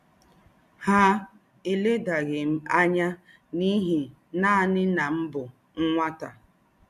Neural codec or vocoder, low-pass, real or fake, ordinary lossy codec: none; 14.4 kHz; real; none